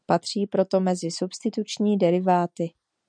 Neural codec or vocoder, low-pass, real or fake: none; 9.9 kHz; real